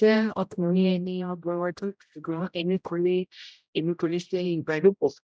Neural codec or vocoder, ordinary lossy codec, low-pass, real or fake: codec, 16 kHz, 0.5 kbps, X-Codec, HuBERT features, trained on general audio; none; none; fake